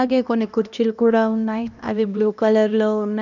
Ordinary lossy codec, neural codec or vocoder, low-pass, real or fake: none; codec, 16 kHz, 1 kbps, X-Codec, HuBERT features, trained on LibriSpeech; 7.2 kHz; fake